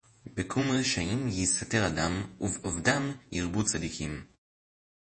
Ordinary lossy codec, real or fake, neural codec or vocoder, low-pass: MP3, 32 kbps; fake; vocoder, 48 kHz, 128 mel bands, Vocos; 9.9 kHz